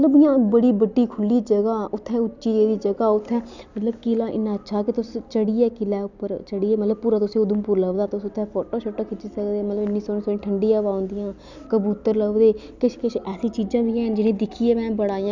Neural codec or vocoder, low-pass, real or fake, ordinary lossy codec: none; 7.2 kHz; real; none